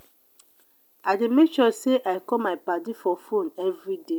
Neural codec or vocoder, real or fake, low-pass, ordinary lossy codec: none; real; none; none